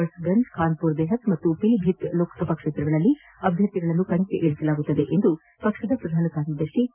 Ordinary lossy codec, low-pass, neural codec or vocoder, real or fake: none; 3.6 kHz; none; real